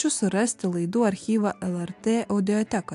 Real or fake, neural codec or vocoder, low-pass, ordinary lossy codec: real; none; 10.8 kHz; AAC, 64 kbps